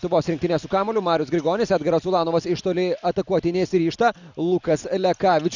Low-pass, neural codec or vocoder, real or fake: 7.2 kHz; none; real